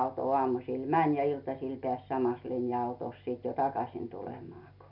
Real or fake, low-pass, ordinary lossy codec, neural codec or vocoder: real; 5.4 kHz; none; none